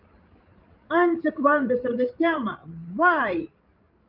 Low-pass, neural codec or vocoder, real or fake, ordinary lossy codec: 5.4 kHz; codec, 16 kHz, 16 kbps, FreqCodec, larger model; fake; Opus, 24 kbps